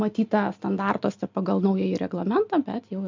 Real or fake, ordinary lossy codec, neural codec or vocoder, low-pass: real; MP3, 64 kbps; none; 7.2 kHz